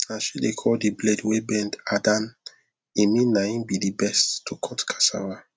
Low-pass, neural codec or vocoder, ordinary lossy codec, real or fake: none; none; none; real